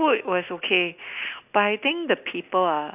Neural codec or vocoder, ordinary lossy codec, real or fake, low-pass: none; none; real; 3.6 kHz